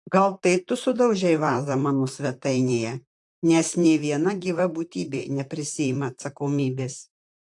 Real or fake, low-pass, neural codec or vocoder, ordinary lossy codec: fake; 10.8 kHz; vocoder, 44.1 kHz, 128 mel bands, Pupu-Vocoder; AAC, 64 kbps